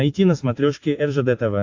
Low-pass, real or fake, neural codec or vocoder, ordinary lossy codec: 7.2 kHz; real; none; AAC, 48 kbps